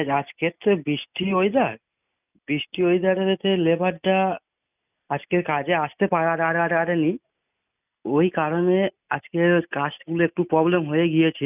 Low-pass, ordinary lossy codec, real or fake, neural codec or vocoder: 3.6 kHz; none; real; none